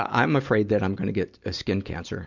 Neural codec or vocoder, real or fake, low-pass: none; real; 7.2 kHz